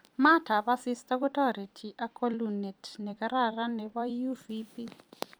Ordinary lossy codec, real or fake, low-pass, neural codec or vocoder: none; fake; none; vocoder, 44.1 kHz, 128 mel bands every 256 samples, BigVGAN v2